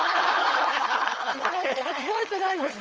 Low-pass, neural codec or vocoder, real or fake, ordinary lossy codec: 7.2 kHz; codec, 16 kHz, 4.8 kbps, FACodec; fake; Opus, 24 kbps